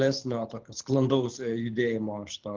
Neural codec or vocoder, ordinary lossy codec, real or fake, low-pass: codec, 24 kHz, 6 kbps, HILCodec; Opus, 16 kbps; fake; 7.2 kHz